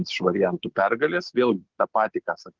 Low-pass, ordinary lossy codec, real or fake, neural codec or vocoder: 7.2 kHz; Opus, 16 kbps; real; none